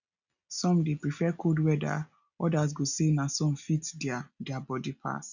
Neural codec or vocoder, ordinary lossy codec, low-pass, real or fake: none; none; 7.2 kHz; real